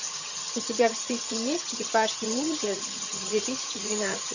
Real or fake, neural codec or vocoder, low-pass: fake; vocoder, 22.05 kHz, 80 mel bands, HiFi-GAN; 7.2 kHz